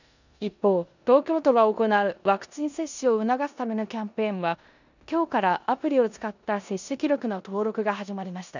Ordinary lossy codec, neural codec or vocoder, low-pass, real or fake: none; codec, 16 kHz in and 24 kHz out, 0.9 kbps, LongCat-Audio-Codec, four codebook decoder; 7.2 kHz; fake